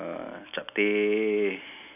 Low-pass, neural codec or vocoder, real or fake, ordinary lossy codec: 3.6 kHz; none; real; none